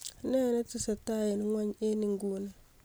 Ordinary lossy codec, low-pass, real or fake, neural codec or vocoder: none; none; real; none